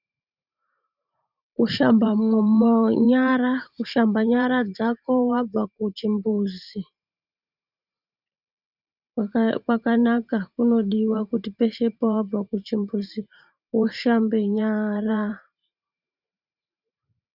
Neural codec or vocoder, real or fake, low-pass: vocoder, 44.1 kHz, 128 mel bands every 512 samples, BigVGAN v2; fake; 5.4 kHz